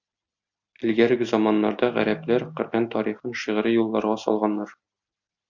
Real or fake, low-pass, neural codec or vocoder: real; 7.2 kHz; none